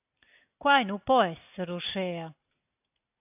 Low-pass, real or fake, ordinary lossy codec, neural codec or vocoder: 3.6 kHz; real; AAC, 32 kbps; none